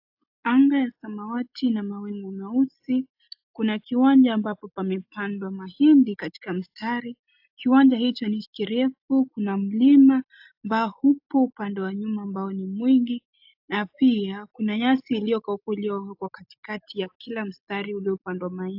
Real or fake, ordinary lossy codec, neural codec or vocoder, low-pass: real; AAC, 48 kbps; none; 5.4 kHz